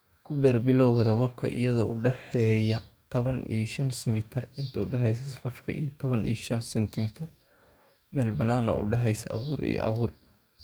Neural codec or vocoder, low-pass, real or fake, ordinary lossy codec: codec, 44.1 kHz, 2.6 kbps, DAC; none; fake; none